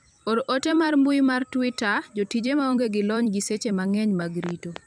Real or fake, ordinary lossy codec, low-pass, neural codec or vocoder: fake; none; 9.9 kHz; vocoder, 44.1 kHz, 128 mel bands every 256 samples, BigVGAN v2